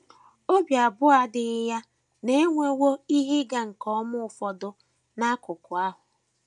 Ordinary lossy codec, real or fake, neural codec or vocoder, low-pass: none; real; none; 10.8 kHz